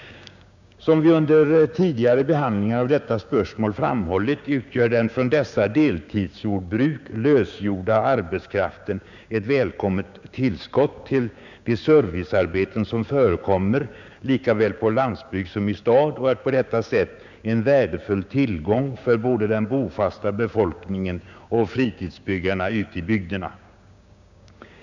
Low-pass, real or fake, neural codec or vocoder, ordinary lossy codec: 7.2 kHz; fake; codec, 16 kHz, 6 kbps, DAC; none